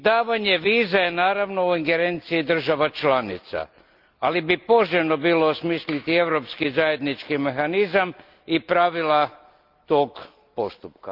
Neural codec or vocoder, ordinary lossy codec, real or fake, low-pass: none; Opus, 24 kbps; real; 5.4 kHz